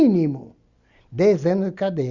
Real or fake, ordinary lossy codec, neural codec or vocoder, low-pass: real; Opus, 64 kbps; none; 7.2 kHz